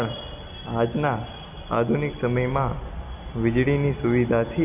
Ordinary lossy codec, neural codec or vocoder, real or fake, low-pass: none; none; real; 3.6 kHz